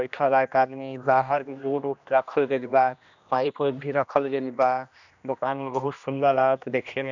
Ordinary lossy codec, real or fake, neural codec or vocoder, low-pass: none; fake; codec, 16 kHz, 1 kbps, X-Codec, HuBERT features, trained on general audio; 7.2 kHz